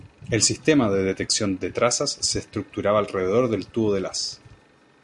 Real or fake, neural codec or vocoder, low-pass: real; none; 10.8 kHz